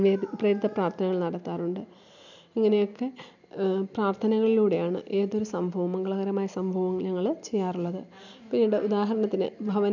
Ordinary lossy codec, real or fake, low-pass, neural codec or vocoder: none; real; 7.2 kHz; none